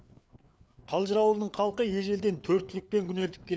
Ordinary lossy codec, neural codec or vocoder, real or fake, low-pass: none; codec, 16 kHz, 4 kbps, FreqCodec, larger model; fake; none